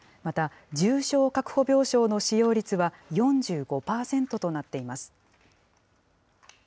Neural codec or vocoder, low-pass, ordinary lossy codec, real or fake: none; none; none; real